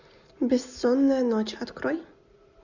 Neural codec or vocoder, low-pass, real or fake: none; 7.2 kHz; real